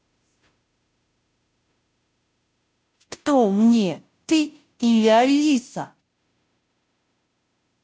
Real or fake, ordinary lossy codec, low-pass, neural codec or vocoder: fake; none; none; codec, 16 kHz, 0.5 kbps, FunCodec, trained on Chinese and English, 25 frames a second